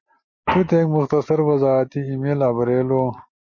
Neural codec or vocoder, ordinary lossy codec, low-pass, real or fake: none; MP3, 32 kbps; 7.2 kHz; real